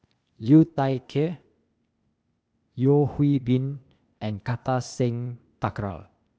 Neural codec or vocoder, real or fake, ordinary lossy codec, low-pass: codec, 16 kHz, 0.8 kbps, ZipCodec; fake; none; none